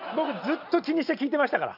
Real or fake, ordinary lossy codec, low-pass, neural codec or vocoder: real; none; 5.4 kHz; none